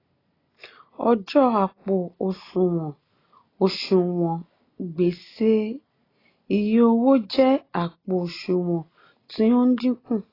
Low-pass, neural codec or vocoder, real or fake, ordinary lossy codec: 5.4 kHz; none; real; AAC, 24 kbps